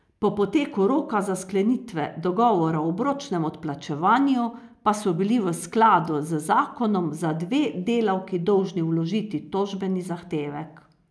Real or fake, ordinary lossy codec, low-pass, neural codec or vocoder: real; none; none; none